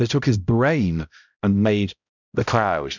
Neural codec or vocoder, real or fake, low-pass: codec, 16 kHz, 0.5 kbps, X-Codec, HuBERT features, trained on balanced general audio; fake; 7.2 kHz